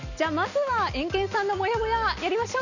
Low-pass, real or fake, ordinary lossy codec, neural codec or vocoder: 7.2 kHz; real; none; none